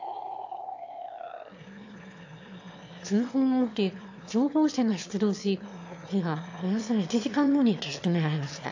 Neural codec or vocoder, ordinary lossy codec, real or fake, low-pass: autoencoder, 22.05 kHz, a latent of 192 numbers a frame, VITS, trained on one speaker; AAC, 48 kbps; fake; 7.2 kHz